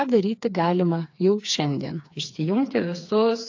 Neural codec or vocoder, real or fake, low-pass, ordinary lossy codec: codec, 16 kHz, 4 kbps, FreqCodec, smaller model; fake; 7.2 kHz; AAC, 48 kbps